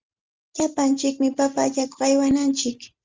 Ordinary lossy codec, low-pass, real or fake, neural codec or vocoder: Opus, 32 kbps; 7.2 kHz; real; none